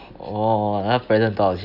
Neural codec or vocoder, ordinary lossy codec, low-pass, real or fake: none; none; 5.4 kHz; real